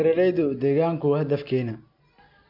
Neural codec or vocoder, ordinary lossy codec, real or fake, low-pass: none; MP3, 48 kbps; real; 5.4 kHz